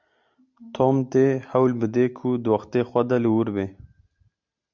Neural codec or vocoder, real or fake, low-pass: none; real; 7.2 kHz